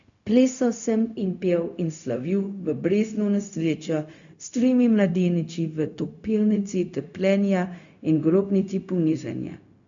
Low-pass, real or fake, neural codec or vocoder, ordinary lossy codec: 7.2 kHz; fake; codec, 16 kHz, 0.4 kbps, LongCat-Audio-Codec; none